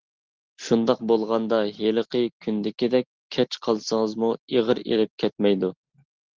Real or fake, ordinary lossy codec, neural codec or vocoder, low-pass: real; Opus, 16 kbps; none; 7.2 kHz